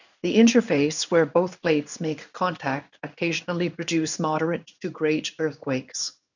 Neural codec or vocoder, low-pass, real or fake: codec, 16 kHz in and 24 kHz out, 1 kbps, XY-Tokenizer; 7.2 kHz; fake